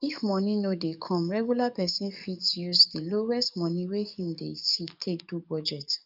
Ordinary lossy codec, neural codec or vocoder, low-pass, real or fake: none; codec, 44.1 kHz, 7.8 kbps, DAC; 5.4 kHz; fake